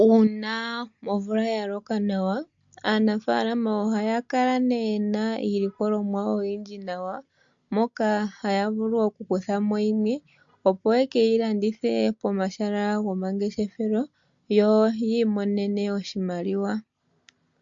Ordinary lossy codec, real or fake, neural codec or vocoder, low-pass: MP3, 48 kbps; real; none; 7.2 kHz